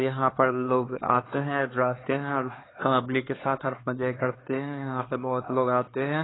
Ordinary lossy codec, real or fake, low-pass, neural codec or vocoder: AAC, 16 kbps; fake; 7.2 kHz; codec, 16 kHz, 2 kbps, X-Codec, HuBERT features, trained on LibriSpeech